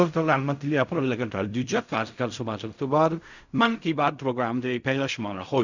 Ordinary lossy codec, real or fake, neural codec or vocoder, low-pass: none; fake; codec, 16 kHz in and 24 kHz out, 0.4 kbps, LongCat-Audio-Codec, fine tuned four codebook decoder; 7.2 kHz